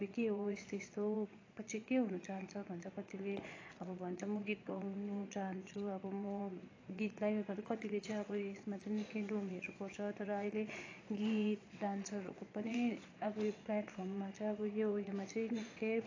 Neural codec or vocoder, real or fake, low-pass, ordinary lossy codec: vocoder, 22.05 kHz, 80 mel bands, Vocos; fake; 7.2 kHz; AAC, 32 kbps